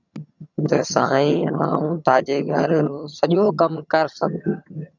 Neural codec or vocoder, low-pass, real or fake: vocoder, 22.05 kHz, 80 mel bands, HiFi-GAN; 7.2 kHz; fake